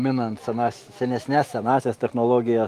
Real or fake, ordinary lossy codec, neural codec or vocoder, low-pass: fake; Opus, 32 kbps; autoencoder, 48 kHz, 128 numbers a frame, DAC-VAE, trained on Japanese speech; 14.4 kHz